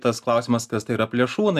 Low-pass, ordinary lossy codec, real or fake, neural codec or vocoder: 14.4 kHz; AAC, 96 kbps; real; none